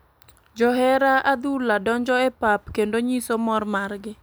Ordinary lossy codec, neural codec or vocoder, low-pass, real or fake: none; none; none; real